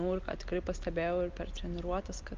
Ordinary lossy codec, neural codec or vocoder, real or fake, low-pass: Opus, 24 kbps; none; real; 7.2 kHz